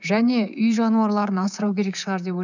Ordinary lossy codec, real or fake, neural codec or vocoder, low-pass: none; fake; codec, 24 kHz, 3.1 kbps, DualCodec; 7.2 kHz